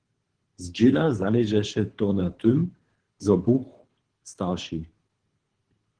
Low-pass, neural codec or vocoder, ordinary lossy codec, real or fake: 9.9 kHz; codec, 24 kHz, 3 kbps, HILCodec; Opus, 16 kbps; fake